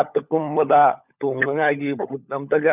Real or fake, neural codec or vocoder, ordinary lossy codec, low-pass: fake; codec, 16 kHz, 16 kbps, FunCodec, trained on LibriTTS, 50 frames a second; none; 3.6 kHz